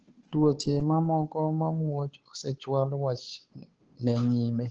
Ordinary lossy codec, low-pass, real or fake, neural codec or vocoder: Opus, 16 kbps; 7.2 kHz; fake; codec, 16 kHz, 2 kbps, FunCodec, trained on Chinese and English, 25 frames a second